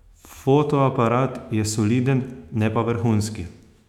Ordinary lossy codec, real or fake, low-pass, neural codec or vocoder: none; fake; 19.8 kHz; autoencoder, 48 kHz, 128 numbers a frame, DAC-VAE, trained on Japanese speech